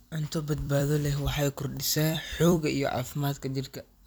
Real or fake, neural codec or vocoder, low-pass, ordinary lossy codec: fake; vocoder, 44.1 kHz, 128 mel bands every 256 samples, BigVGAN v2; none; none